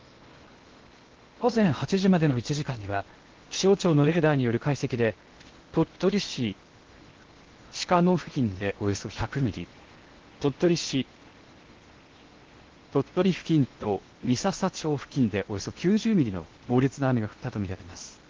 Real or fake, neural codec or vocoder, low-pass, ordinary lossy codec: fake; codec, 16 kHz in and 24 kHz out, 0.8 kbps, FocalCodec, streaming, 65536 codes; 7.2 kHz; Opus, 16 kbps